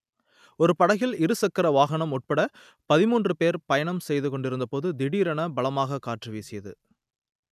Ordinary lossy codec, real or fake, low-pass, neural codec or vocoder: none; real; 14.4 kHz; none